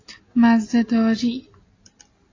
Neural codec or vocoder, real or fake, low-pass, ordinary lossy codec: none; real; 7.2 kHz; AAC, 32 kbps